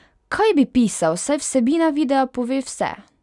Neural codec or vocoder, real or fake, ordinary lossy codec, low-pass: none; real; none; 10.8 kHz